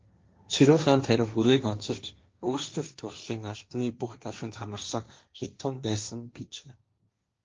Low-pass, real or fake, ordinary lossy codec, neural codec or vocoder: 7.2 kHz; fake; Opus, 24 kbps; codec, 16 kHz, 1.1 kbps, Voila-Tokenizer